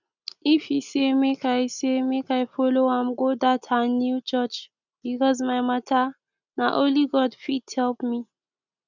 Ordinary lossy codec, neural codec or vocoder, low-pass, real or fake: none; none; 7.2 kHz; real